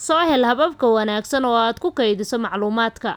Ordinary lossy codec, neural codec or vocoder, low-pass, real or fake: none; none; none; real